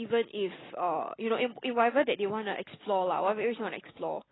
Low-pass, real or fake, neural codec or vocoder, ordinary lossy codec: 7.2 kHz; real; none; AAC, 16 kbps